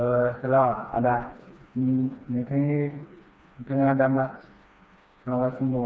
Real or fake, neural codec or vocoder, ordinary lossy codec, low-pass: fake; codec, 16 kHz, 2 kbps, FreqCodec, smaller model; none; none